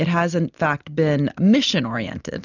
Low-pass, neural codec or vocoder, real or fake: 7.2 kHz; none; real